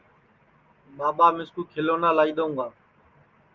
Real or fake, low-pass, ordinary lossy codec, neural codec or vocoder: real; 7.2 kHz; Opus, 32 kbps; none